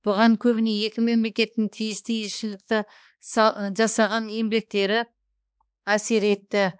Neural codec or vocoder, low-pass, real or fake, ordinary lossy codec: codec, 16 kHz, 2 kbps, X-Codec, HuBERT features, trained on balanced general audio; none; fake; none